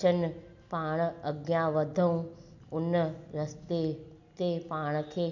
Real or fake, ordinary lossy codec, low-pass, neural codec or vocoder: real; none; 7.2 kHz; none